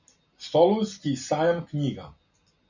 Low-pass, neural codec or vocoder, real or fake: 7.2 kHz; none; real